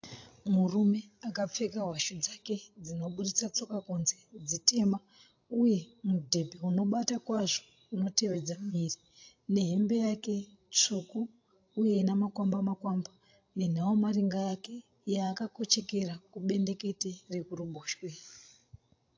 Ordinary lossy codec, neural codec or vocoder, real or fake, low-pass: AAC, 48 kbps; codec, 16 kHz, 16 kbps, FreqCodec, larger model; fake; 7.2 kHz